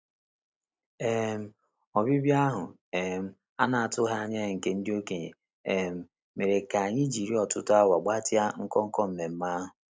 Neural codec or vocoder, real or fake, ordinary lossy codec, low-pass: none; real; none; none